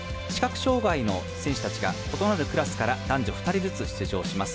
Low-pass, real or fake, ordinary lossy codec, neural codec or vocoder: none; real; none; none